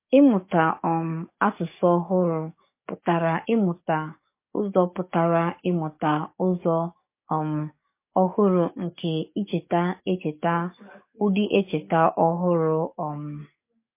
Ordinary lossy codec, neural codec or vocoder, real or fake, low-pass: MP3, 24 kbps; codec, 44.1 kHz, 7.8 kbps, DAC; fake; 3.6 kHz